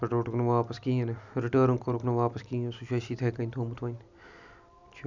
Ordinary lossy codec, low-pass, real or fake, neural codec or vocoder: none; 7.2 kHz; real; none